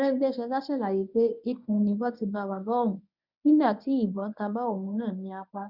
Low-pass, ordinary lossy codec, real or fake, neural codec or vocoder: 5.4 kHz; none; fake; codec, 24 kHz, 0.9 kbps, WavTokenizer, medium speech release version 1